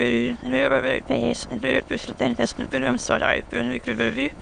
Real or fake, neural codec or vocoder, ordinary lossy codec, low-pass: fake; autoencoder, 22.05 kHz, a latent of 192 numbers a frame, VITS, trained on many speakers; Opus, 64 kbps; 9.9 kHz